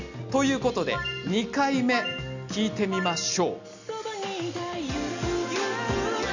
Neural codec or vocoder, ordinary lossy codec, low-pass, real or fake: none; none; 7.2 kHz; real